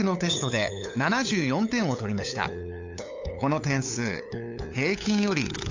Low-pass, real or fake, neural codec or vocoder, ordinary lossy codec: 7.2 kHz; fake; codec, 16 kHz, 8 kbps, FunCodec, trained on LibriTTS, 25 frames a second; AAC, 48 kbps